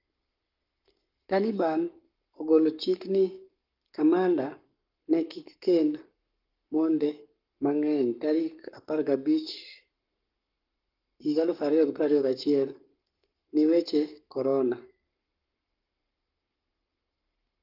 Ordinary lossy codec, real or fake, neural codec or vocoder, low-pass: Opus, 32 kbps; fake; codec, 44.1 kHz, 7.8 kbps, Pupu-Codec; 5.4 kHz